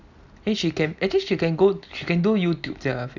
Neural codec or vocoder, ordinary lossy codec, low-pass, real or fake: none; none; 7.2 kHz; real